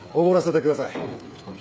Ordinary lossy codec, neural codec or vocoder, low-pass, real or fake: none; codec, 16 kHz, 8 kbps, FreqCodec, smaller model; none; fake